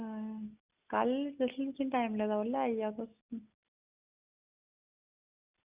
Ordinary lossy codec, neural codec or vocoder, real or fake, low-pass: Opus, 64 kbps; none; real; 3.6 kHz